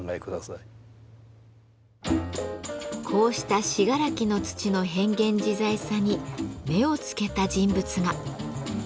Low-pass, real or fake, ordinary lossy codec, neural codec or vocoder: none; real; none; none